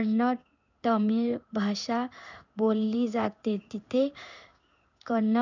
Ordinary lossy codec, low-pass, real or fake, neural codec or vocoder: none; 7.2 kHz; fake; codec, 16 kHz in and 24 kHz out, 1 kbps, XY-Tokenizer